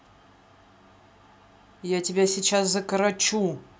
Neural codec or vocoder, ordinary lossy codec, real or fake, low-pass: none; none; real; none